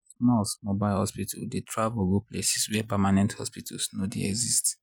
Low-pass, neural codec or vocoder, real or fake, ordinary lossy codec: none; none; real; none